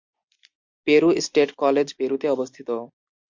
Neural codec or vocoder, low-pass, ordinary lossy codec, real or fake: none; 7.2 kHz; MP3, 64 kbps; real